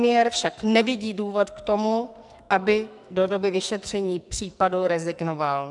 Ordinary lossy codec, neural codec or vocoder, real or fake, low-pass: MP3, 96 kbps; codec, 44.1 kHz, 2.6 kbps, SNAC; fake; 10.8 kHz